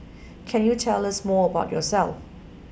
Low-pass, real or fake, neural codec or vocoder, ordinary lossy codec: none; real; none; none